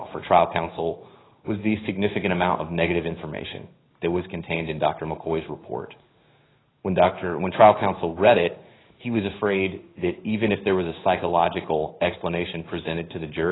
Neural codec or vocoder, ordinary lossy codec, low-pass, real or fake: codec, 16 kHz in and 24 kHz out, 1 kbps, XY-Tokenizer; AAC, 16 kbps; 7.2 kHz; fake